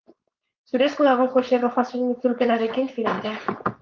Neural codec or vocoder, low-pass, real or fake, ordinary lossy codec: codec, 16 kHz, 4.8 kbps, FACodec; 7.2 kHz; fake; Opus, 24 kbps